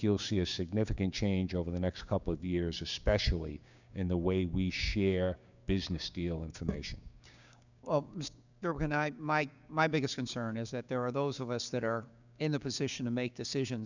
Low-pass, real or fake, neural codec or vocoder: 7.2 kHz; fake; codec, 16 kHz, 6 kbps, DAC